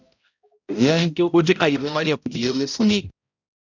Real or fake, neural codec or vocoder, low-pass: fake; codec, 16 kHz, 0.5 kbps, X-Codec, HuBERT features, trained on balanced general audio; 7.2 kHz